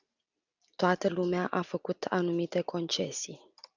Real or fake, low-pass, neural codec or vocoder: real; 7.2 kHz; none